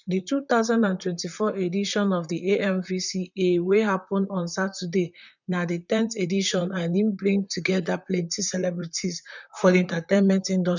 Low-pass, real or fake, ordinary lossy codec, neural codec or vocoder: 7.2 kHz; fake; none; vocoder, 44.1 kHz, 128 mel bands, Pupu-Vocoder